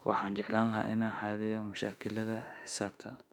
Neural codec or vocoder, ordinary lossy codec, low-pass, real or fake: autoencoder, 48 kHz, 32 numbers a frame, DAC-VAE, trained on Japanese speech; none; 19.8 kHz; fake